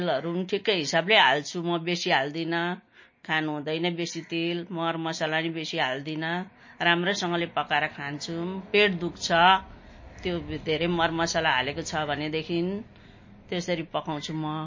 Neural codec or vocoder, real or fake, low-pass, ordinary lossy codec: none; real; 7.2 kHz; MP3, 32 kbps